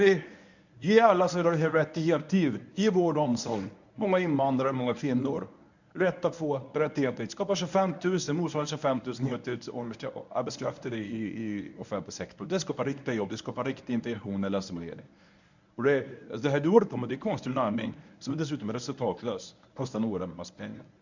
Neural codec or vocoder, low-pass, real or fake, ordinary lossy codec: codec, 24 kHz, 0.9 kbps, WavTokenizer, medium speech release version 1; 7.2 kHz; fake; none